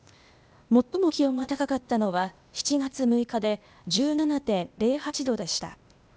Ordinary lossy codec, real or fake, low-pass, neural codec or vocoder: none; fake; none; codec, 16 kHz, 0.8 kbps, ZipCodec